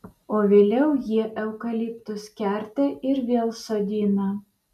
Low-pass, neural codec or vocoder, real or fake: 14.4 kHz; none; real